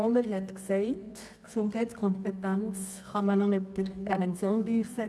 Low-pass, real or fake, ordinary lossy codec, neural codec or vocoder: none; fake; none; codec, 24 kHz, 0.9 kbps, WavTokenizer, medium music audio release